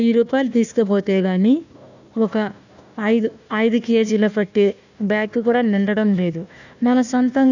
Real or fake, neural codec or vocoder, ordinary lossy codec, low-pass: fake; codec, 16 kHz, 1 kbps, FunCodec, trained on Chinese and English, 50 frames a second; none; 7.2 kHz